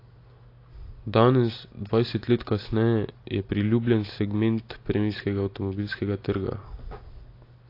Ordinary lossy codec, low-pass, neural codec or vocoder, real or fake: AAC, 32 kbps; 5.4 kHz; none; real